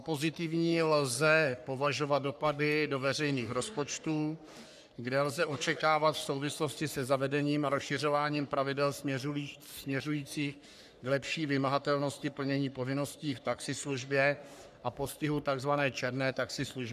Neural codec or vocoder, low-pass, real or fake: codec, 44.1 kHz, 3.4 kbps, Pupu-Codec; 14.4 kHz; fake